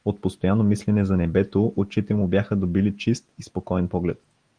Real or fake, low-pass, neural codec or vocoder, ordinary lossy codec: real; 9.9 kHz; none; Opus, 24 kbps